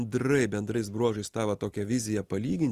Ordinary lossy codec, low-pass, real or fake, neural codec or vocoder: Opus, 24 kbps; 14.4 kHz; real; none